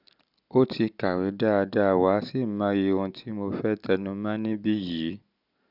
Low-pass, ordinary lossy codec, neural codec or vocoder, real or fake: 5.4 kHz; none; none; real